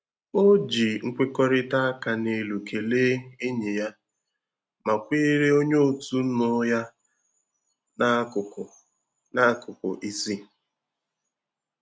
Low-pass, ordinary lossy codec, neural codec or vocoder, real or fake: none; none; none; real